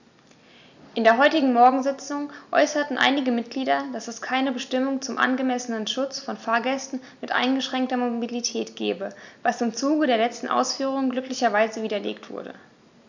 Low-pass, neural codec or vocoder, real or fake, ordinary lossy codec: 7.2 kHz; none; real; none